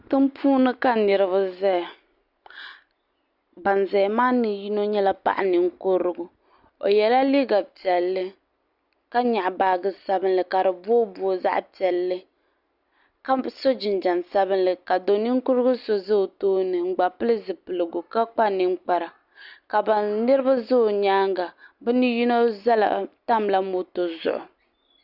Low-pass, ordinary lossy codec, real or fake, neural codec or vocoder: 5.4 kHz; Opus, 64 kbps; real; none